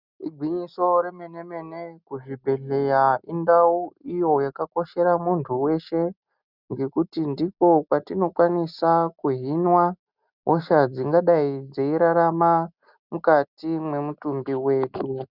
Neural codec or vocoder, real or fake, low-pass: none; real; 5.4 kHz